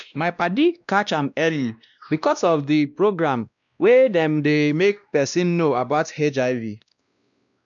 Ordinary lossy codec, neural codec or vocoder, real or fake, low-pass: none; codec, 16 kHz, 1 kbps, X-Codec, WavLM features, trained on Multilingual LibriSpeech; fake; 7.2 kHz